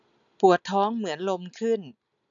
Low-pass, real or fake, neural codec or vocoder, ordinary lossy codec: 7.2 kHz; real; none; none